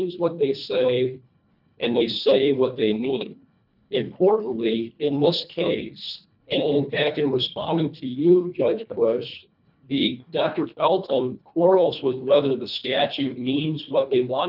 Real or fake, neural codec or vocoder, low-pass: fake; codec, 24 kHz, 1.5 kbps, HILCodec; 5.4 kHz